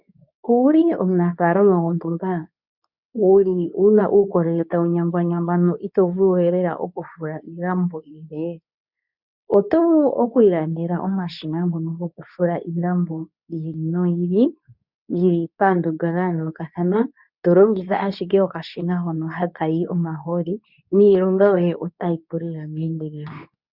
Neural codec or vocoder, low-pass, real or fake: codec, 24 kHz, 0.9 kbps, WavTokenizer, medium speech release version 2; 5.4 kHz; fake